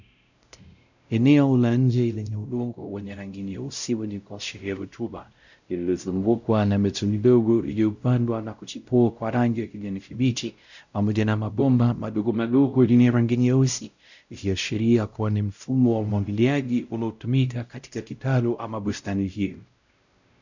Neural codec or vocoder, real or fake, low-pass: codec, 16 kHz, 0.5 kbps, X-Codec, WavLM features, trained on Multilingual LibriSpeech; fake; 7.2 kHz